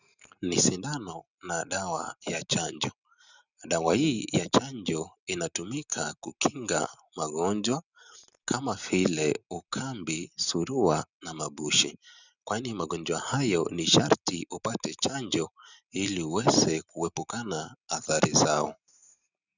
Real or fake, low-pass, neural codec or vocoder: real; 7.2 kHz; none